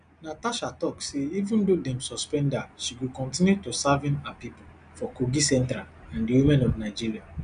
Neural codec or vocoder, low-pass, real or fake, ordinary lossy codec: none; 9.9 kHz; real; none